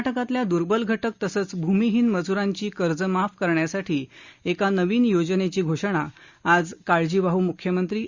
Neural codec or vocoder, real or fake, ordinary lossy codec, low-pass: none; real; Opus, 64 kbps; 7.2 kHz